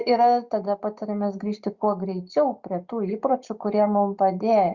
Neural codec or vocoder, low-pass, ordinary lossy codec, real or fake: none; 7.2 kHz; Opus, 64 kbps; real